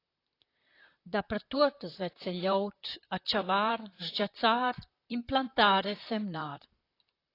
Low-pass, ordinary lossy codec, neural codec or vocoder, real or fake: 5.4 kHz; AAC, 32 kbps; vocoder, 44.1 kHz, 128 mel bands, Pupu-Vocoder; fake